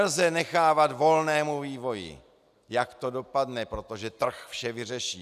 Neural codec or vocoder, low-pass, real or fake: none; 14.4 kHz; real